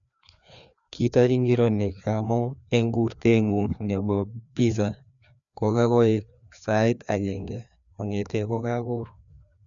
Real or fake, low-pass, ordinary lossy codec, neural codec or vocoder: fake; 7.2 kHz; none; codec, 16 kHz, 2 kbps, FreqCodec, larger model